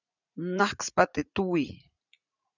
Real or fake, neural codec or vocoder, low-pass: fake; vocoder, 44.1 kHz, 80 mel bands, Vocos; 7.2 kHz